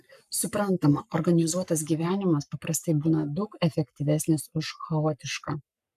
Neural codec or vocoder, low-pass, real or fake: codec, 44.1 kHz, 7.8 kbps, Pupu-Codec; 14.4 kHz; fake